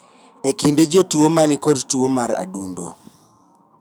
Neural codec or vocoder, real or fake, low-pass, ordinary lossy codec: codec, 44.1 kHz, 2.6 kbps, SNAC; fake; none; none